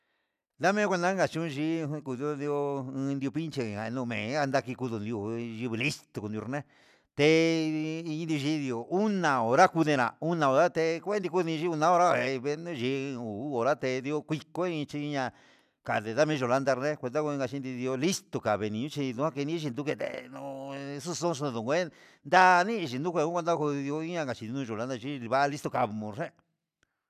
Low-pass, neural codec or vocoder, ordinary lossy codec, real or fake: 14.4 kHz; none; none; real